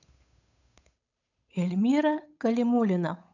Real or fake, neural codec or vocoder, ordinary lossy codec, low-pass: fake; codec, 16 kHz, 8 kbps, FunCodec, trained on Chinese and English, 25 frames a second; none; 7.2 kHz